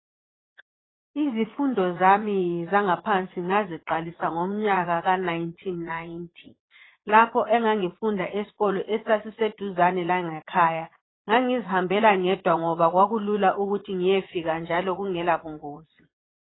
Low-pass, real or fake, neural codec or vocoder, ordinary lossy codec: 7.2 kHz; fake; vocoder, 22.05 kHz, 80 mel bands, Vocos; AAC, 16 kbps